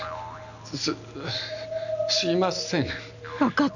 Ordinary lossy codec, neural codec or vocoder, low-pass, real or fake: none; none; 7.2 kHz; real